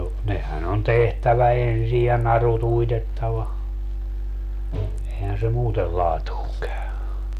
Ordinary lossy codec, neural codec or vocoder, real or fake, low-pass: none; none; real; 14.4 kHz